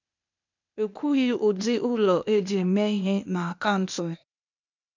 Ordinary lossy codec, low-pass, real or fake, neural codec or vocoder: none; 7.2 kHz; fake; codec, 16 kHz, 0.8 kbps, ZipCodec